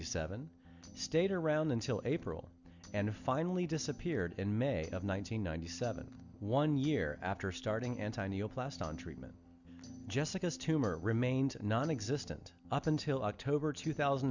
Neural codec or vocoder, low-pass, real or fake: none; 7.2 kHz; real